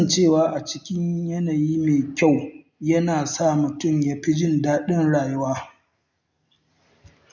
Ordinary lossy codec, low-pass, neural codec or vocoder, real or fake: none; 7.2 kHz; none; real